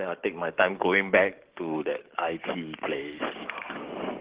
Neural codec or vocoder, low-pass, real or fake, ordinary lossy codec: codec, 44.1 kHz, 7.8 kbps, DAC; 3.6 kHz; fake; Opus, 16 kbps